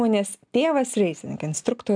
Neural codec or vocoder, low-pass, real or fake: none; 9.9 kHz; real